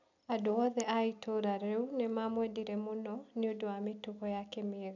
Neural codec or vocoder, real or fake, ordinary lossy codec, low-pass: none; real; none; 7.2 kHz